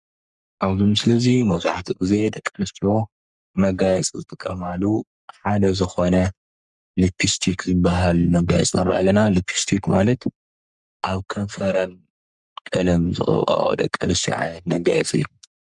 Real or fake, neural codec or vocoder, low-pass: fake; codec, 44.1 kHz, 3.4 kbps, Pupu-Codec; 10.8 kHz